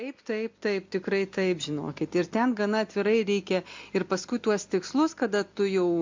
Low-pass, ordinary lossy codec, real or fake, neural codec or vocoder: 7.2 kHz; MP3, 48 kbps; real; none